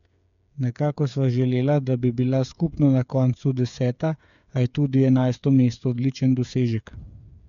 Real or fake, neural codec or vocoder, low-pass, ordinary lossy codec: fake; codec, 16 kHz, 8 kbps, FreqCodec, smaller model; 7.2 kHz; none